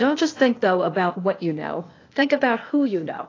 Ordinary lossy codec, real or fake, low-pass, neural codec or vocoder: AAC, 32 kbps; fake; 7.2 kHz; codec, 16 kHz, 0.8 kbps, ZipCodec